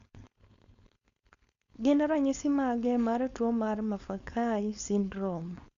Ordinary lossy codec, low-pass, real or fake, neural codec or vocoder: MP3, 64 kbps; 7.2 kHz; fake; codec, 16 kHz, 4.8 kbps, FACodec